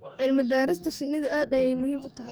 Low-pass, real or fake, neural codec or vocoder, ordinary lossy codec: none; fake; codec, 44.1 kHz, 2.6 kbps, DAC; none